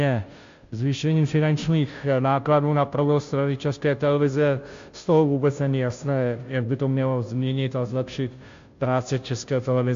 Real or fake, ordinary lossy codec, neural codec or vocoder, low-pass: fake; MP3, 48 kbps; codec, 16 kHz, 0.5 kbps, FunCodec, trained on Chinese and English, 25 frames a second; 7.2 kHz